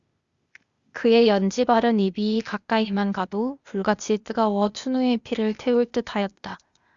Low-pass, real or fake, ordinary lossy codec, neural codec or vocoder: 7.2 kHz; fake; Opus, 64 kbps; codec, 16 kHz, 0.8 kbps, ZipCodec